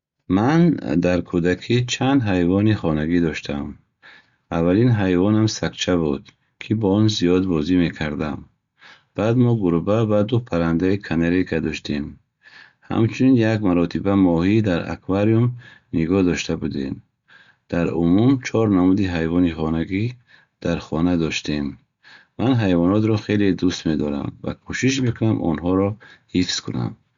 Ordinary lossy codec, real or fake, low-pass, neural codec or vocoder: Opus, 64 kbps; real; 7.2 kHz; none